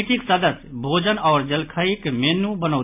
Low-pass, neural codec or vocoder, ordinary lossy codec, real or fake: 3.6 kHz; none; MP3, 32 kbps; real